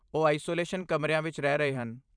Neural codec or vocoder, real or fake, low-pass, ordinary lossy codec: vocoder, 44.1 kHz, 128 mel bands every 512 samples, BigVGAN v2; fake; 9.9 kHz; none